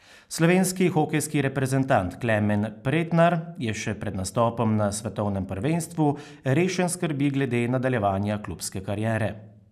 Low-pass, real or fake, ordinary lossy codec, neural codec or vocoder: 14.4 kHz; real; none; none